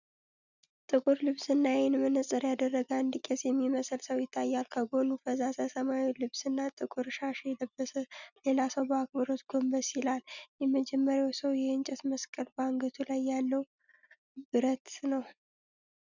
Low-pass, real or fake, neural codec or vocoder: 7.2 kHz; real; none